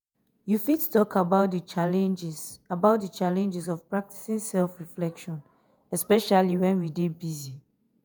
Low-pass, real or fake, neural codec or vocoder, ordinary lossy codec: none; fake; vocoder, 48 kHz, 128 mel bands, Vocos; none